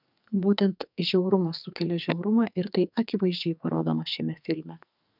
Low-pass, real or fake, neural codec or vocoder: 5.4 kHz; fake; codec, 44.1 kHz, 2.6 kbps, SNAC